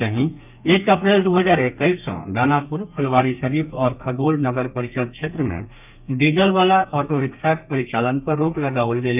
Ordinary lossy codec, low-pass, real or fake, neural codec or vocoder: none; 3.6 kHz; fake; codec, 32 kHz, 1.9 kbps, SNAC